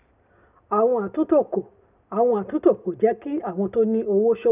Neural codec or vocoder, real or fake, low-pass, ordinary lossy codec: none; real; 3.6 kHz; none